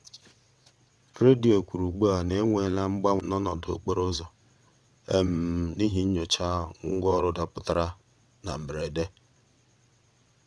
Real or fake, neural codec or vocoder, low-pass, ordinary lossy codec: fake; vocoder, 22.05 kHz, 80 mel bands, WaveNeXt; none; none